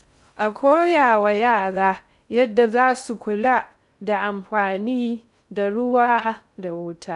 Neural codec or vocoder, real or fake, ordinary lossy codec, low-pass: codec, 16 kHz in and 24 kHz out, 0.6 kbps, FocalCodec, streaming, 2048 codes; fake; none; 10.8 kHz